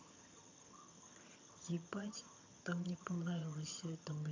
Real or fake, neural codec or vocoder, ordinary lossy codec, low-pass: fake; vocoder, 22.05 kHz, 80 mel bands, HiFi-GAN; AAC, 48 kbps; 7.2 kHz